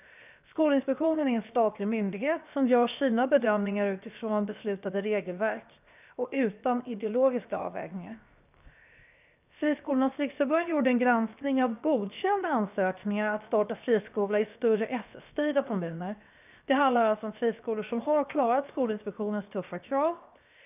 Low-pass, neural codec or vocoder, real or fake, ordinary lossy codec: 3.6 kHz; codec, 16 kHz, 0.7 kbps, FocalCodec; fake; none